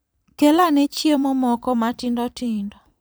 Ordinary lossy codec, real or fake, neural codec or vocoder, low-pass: none; fake; vocoder, 44.1 kHz, 128 mel bands every 512 samples, BigVGAN v2; none